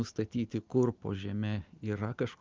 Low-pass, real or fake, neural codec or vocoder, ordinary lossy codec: 7.2 kHz; real; none; Opus, 32 kbps